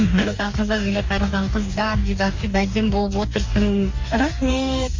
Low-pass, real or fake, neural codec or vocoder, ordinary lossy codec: 7.2 kHz; fake; codec, 44.1 kHz, 2.6 kbps, DAC; MP3, 48 kbps